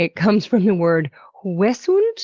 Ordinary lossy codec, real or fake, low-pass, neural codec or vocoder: Opus, 16 kbps; real; 7.2 kHz; none